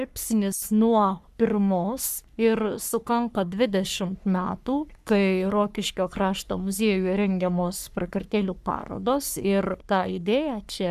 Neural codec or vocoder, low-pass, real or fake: codec, 44.1 kHz, 3.4 kbps, Pupu-Codec; 14.4 kHz; fake